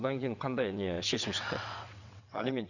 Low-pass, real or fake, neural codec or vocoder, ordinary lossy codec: 7.2 kHz; fake; vocoder, 22.05 kHz, 80 mel bands, WaveNeXt; none